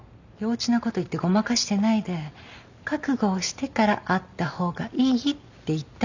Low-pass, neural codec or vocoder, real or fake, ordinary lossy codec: 7.2 kHz; none; real; none